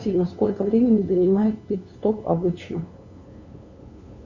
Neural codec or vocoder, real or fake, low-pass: codec, 16 kHz, 2 kbps, FunCodec, trained on LibriTTS, 25 frames a second; fake; 7.2 kHz